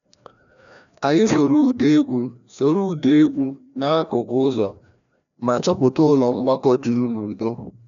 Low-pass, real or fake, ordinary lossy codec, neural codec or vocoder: 7.2 kHz; fake; none; codec, 16 kHz, 1 kbps, FreqCodec, larger model